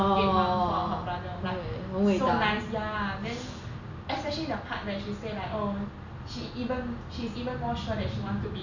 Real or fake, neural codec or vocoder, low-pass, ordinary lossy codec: real; none; 7.2 kHz; none